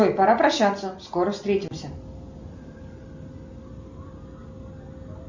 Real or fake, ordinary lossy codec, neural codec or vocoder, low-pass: real; Opus, 64 kbps; none; 7.2 kHz